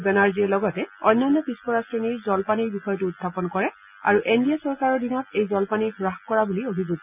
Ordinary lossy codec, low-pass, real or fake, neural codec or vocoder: none; 3.6 kHz; real; none